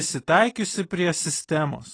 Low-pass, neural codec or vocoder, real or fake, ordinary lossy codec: 9.9 kHz; none; real; AAC, 32 kbps